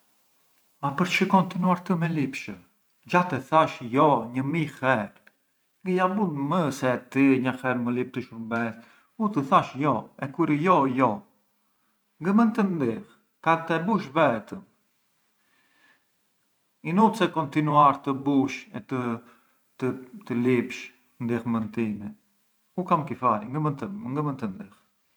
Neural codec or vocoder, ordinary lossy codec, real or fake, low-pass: vocoder, 44.1 kHz, 128 mel bands every 512 samples, BigVGAN v2; none; fake; none